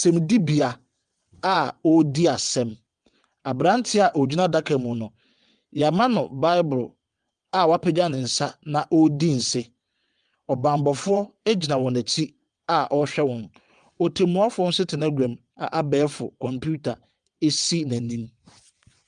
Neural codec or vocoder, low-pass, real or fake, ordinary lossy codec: vocoder, 22.05 kHz, 80 mel bands, WaveNeXt; 9.9 kHz; fake; Opus, 32 kbps